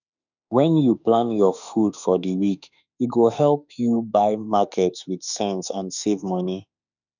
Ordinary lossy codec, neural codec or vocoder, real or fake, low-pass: none; autoencoder, 48 kHz, 32 numbers a frame, DAC-VAE, trained on Japanese speech; fake; 7.2 kHz